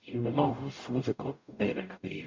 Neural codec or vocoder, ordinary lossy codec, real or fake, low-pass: codec, 44.1 kHz, 0.9 kbps, DAC; MP3, 48 kbps; fake; 7.2 kHz